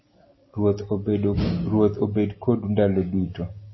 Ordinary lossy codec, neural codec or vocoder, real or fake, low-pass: MP3, 24 kbps; none; real; 7.2 kHz